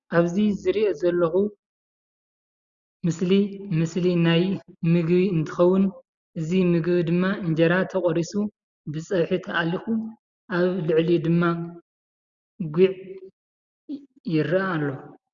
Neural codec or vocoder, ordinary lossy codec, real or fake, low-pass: none; Opus, 64 kbps; real; 7.2 kHz